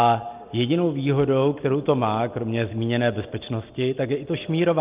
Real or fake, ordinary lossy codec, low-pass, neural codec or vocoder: real; Opus, 24 kbps; 3.6 kHz; none